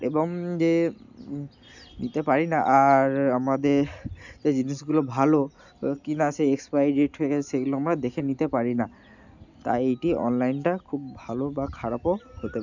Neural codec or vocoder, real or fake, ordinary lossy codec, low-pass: none; real; none; 7.2 kHz